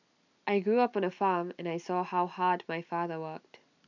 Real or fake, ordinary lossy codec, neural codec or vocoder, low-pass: real; none; none; 7.2 kHz